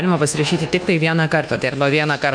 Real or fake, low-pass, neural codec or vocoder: fake; 9.9 kHz; codec, 24 kHz, 1.2 kbps, DualCodec